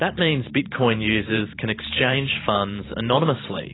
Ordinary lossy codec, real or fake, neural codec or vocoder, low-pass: AAC, 16 kbps; fake; vocoder, 44.1 kHz, 128 mel bands every 512 samples, BigVGAN v2; 7.2 kHz